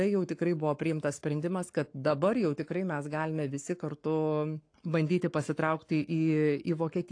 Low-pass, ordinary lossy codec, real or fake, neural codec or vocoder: 9.9 kHz; AAC, 48 kbps; fake; codec, 44.1 kHz, 7.8 kbps, Pupu-Codec